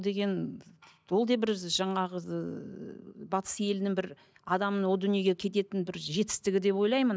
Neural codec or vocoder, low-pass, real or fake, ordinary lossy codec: none; none; real; none